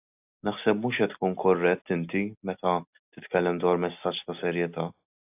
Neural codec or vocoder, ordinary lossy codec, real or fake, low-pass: none; AAC, 32 kbps; real; 3.6 kHz